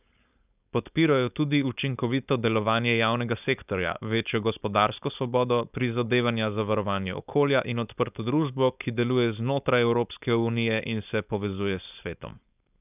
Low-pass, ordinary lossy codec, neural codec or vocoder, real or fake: 3.6 kHz; none; codec, 16 kHz, 4.8 kbps, FACodec; fake